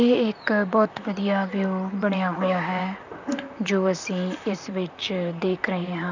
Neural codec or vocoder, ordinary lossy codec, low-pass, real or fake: vocoder, 44.1 kHz, 128 mel bands, Pupu-Vocoder; none; 7.2 kHz; fake